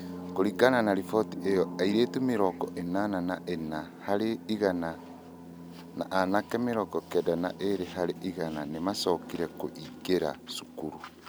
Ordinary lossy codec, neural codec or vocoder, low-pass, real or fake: none; none; none; real